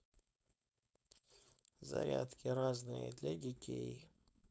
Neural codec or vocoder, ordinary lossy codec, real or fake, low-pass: codec, 16 kHz, 4.8 kbps, FACodec; none; fake; none